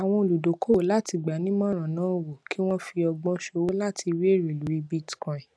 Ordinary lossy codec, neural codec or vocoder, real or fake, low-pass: none; none; real; none